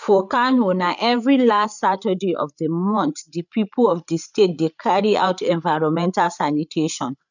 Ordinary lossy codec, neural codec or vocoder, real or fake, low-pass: none; codec, 16 kHz, 8 kbps, FreqCodec, larger model; fake; 7.2 kHz